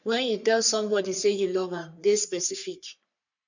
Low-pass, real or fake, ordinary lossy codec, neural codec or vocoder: 7.2 kHz; fake; none; codec, 44.1 kHz, 3.4 kbps, Pupu-Codec